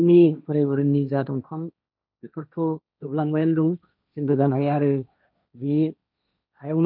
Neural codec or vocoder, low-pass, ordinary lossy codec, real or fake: codec, 16 kHz, 1.1 kbps, Voila-Tokenizer; 5.4 kHz; none; fake